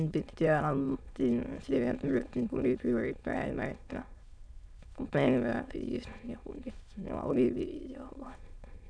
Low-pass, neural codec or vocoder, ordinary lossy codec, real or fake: 9.9 kHz; autoencoder, 22.05 kHz, a latent of 192 numbers a frame, VITS, trained on many speakers; none; fake